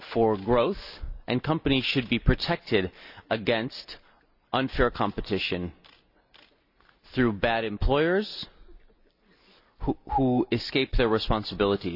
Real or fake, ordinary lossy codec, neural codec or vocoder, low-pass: real; none; none; 5.4 kHz